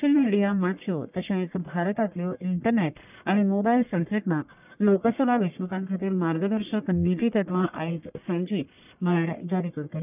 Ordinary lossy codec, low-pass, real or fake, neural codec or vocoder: none; 3.6 kHz; fake; codec, 44.1 kHz, 1.7 kbps, Pupu-Codec